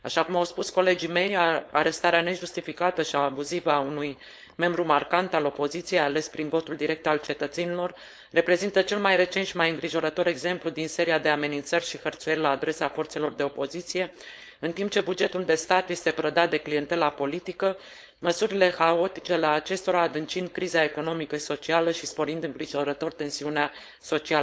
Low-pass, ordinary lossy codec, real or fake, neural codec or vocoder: none; none; fake; codec, 16 kHz, 4.8 kbps, FACodec